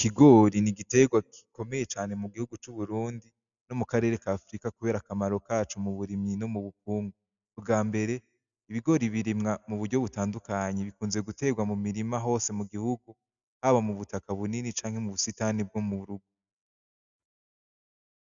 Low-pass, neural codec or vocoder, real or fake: 7.2 kHz; none; real